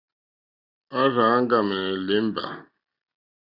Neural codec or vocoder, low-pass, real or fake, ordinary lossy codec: none; 5.4 kHz; real; Opus, 64 kbps